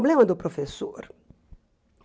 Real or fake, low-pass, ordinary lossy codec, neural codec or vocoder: real; none; none; none